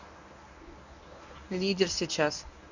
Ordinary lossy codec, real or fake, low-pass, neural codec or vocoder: none; fake; 7.2 kHz; codec, 24 kHz, 0.9 kbps, WavTokenizer, medium speech release version 1